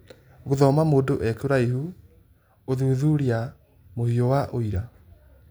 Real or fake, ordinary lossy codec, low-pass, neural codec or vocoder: real; none; none; none